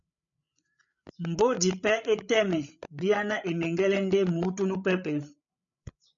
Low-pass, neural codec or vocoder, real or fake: 7.2 kHz; codec, 16 kHz, 8 kbps, FreqCodec, larger model; fake